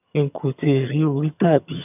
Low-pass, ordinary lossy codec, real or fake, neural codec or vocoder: 3.6 kHz; none; fake; vocoder, 22.05 kHz, 80 mel bands, HiFi-GAN